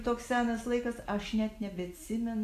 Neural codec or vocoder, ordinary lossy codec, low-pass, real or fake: none; AAC, 64 kbps; 14.4 kHz; real